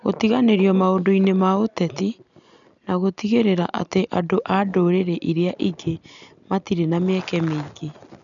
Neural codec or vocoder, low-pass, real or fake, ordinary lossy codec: none; 7.2 kHz; real; none